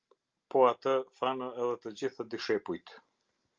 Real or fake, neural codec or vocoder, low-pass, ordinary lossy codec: real; none; 7.2 kHz; Opus, 24 kbps